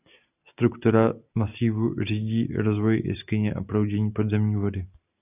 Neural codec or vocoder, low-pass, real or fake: none; 3.6 kHz; real